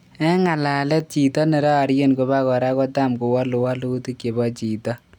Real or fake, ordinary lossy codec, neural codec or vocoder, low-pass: real; none; none; 19.8 kHz